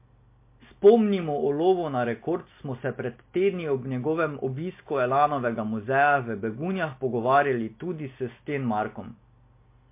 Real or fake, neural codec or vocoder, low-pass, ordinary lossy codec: real; none; 3.6 kHz; MP3, 24 kbps